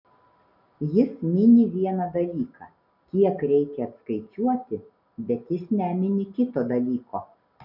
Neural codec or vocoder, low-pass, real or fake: none; 5.4 kHz; real